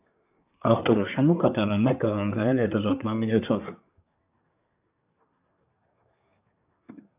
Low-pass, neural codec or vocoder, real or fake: 3.6 kHz; codec, 24 kHz, 1 kbps, SNAC; fake